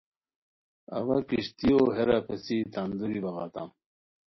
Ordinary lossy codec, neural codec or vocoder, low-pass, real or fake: MP3, 24 kbps; none; 7.2 kHz; real